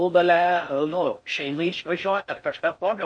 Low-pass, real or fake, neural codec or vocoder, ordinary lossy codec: 9.9 kHz; fake; codec, 16 kHz in and 24 kHz out, 0.6 kbps, FocalCodec, streaming, 4096 codes; MP3, 48 kbps